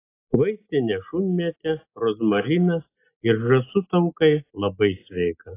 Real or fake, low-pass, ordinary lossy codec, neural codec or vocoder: real; 3.6 kHz; AAC, 24 kbps; none